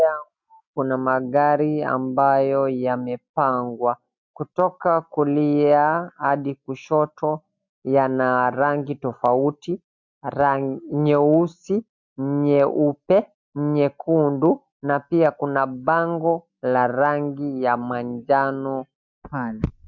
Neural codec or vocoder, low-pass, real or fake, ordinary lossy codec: none; 7.2 kHz; real; MP3, 64 kbps